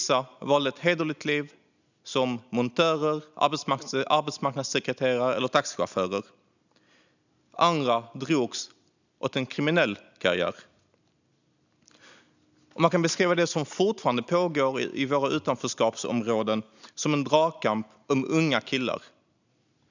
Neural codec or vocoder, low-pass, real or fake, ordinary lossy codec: none; 7.2 kHz; real; none